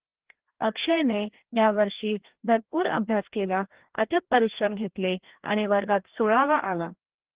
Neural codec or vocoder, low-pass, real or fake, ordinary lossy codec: codec, 16 kHz, 1 kbps, FreqCodec, larger model; 3.6 kHz; fake; Opus, 16 kbps